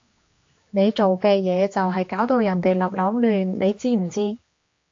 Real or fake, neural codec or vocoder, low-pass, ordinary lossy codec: fake; codec, 16 kHz, 2 kbps, X-Codec, HuBERT features, trained on general audio; 7.2 kHz; AAC, 48 kbps